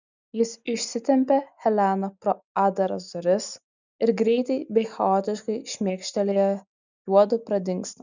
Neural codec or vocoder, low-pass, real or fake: none; 7.2 kHz; real